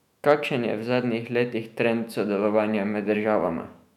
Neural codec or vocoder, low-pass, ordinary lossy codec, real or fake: autoencoder, 48 kHz, 128 numbers a frame, DAC-VAE, trained on Japanese speech; 19.8 kHz; none; fake